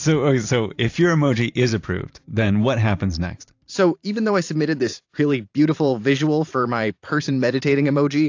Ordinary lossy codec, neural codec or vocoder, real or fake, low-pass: AAC, 48 kbps; vocoder, 44.1 kHz, 128 mel bands every 512 samples, BigVGAN v2; fake; 7.2 kHz